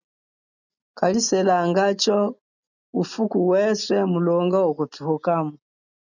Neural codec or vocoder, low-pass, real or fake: none; 7.2 kHz; real